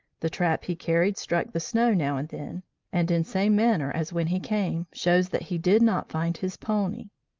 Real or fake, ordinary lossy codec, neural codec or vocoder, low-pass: real; Opus, 24 kbps; none; 7.2 kHz